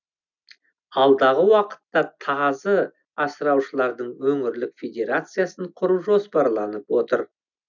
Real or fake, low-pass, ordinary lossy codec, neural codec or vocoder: real; 7.2 kHz; none; none